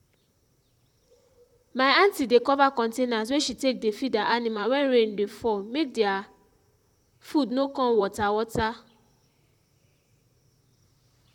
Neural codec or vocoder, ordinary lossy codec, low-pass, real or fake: vocoder, 44.1 kHz, 128 mel bands, Pupu-Vocoder; none; 19.8 kHz; fake